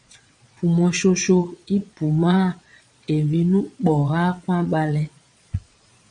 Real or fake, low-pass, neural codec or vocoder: fake; 9.9 kHz; vocoder, 22.05 kHz, 80 mel bands, Vocos